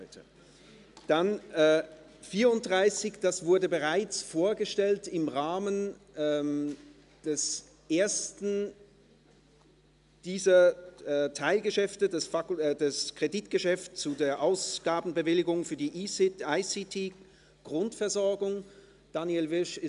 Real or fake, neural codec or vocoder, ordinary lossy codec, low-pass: real; none; MP3, 96 kbps; 10.8 kHz